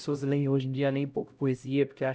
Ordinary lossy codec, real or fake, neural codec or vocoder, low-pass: none; fake; codec, 16 kHz, 0.5 kbps, X-Codec, HuBERT features, trained on LibriSpeech; none